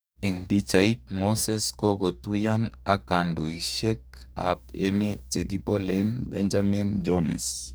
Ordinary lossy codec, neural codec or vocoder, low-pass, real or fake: none; codec, 44.1 kHz, 2.6 kbps, DAC; none; fake